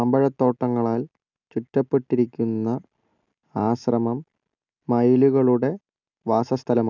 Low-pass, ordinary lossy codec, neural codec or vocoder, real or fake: 7.2 kHz; none; none; real